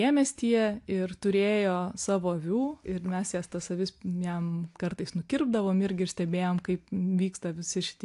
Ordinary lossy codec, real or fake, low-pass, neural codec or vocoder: AAC, 64 kbps; real; 10.8 kHz; none